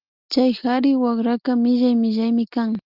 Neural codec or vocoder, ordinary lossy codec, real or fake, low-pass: none; Opus, 32 kbps; real; 5.4 kHz